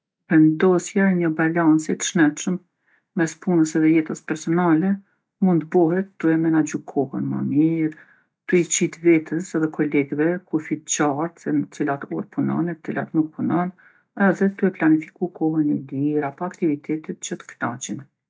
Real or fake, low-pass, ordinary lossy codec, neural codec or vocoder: real; none; none; none